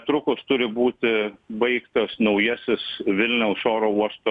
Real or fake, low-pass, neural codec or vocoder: real; 10.8 kHz; none